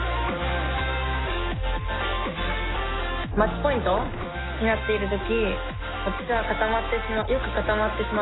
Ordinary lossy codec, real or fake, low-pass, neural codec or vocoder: AAC, 16 kbps; real; 7.2 kHz; none